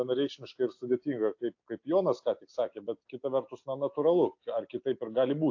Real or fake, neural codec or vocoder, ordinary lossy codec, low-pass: real; none; AAC, 48 kbps; 7.2 kHz